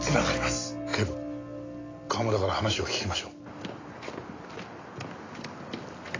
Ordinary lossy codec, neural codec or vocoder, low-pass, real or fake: AAC, 32 kbps; none; 7.2 kHz; real